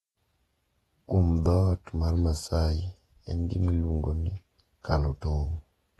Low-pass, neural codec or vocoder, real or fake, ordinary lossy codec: 19.8 kHz; vocoder, 48 kHz, 128 mel bands, Vocos; fake; AAC, 32 kbps